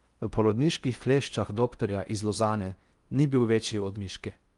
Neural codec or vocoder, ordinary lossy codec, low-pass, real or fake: codec, 16 kHz in and 24 kHz out, 0.6 kbps, FocalCodec, streaming, 4096 codes; Opus, 32 kbps; 10.8 kHz; fake